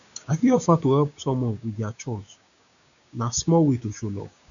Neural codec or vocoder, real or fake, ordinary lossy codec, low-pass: codec, 16 kHz, 6 kbps, DAC; fake; none; 7.2 kHz